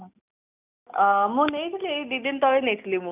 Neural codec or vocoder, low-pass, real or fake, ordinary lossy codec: none; 3.6 kHz; real; none